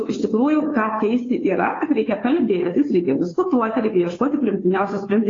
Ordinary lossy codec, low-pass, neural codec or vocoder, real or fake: AAC, 32 kbps; 7.2 kHz; codec, 16 kHz, 2 kbps, FunCodec, trained on Chinese and English, 25 frames a second; fake